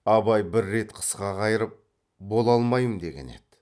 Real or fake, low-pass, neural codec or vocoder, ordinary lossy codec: real; none; none; none